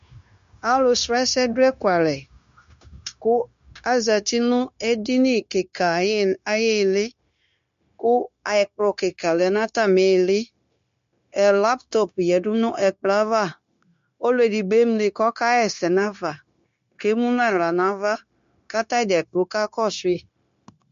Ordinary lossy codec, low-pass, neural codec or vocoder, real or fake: MP3, 48 kbps; 7.2 kHz; codec, 16 kHz, 0.9 kbps, LongCat-Audio-Codec; fake